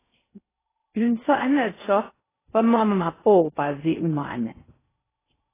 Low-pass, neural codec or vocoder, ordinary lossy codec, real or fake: 3.6 kHz; codec, 16 kHz in and 24 kHz out, 0.6 kbps, FocalCodec, streaming, 4096 codes; AAC, 16 kbps; fake